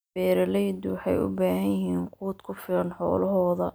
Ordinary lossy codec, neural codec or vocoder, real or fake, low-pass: none; none; real; none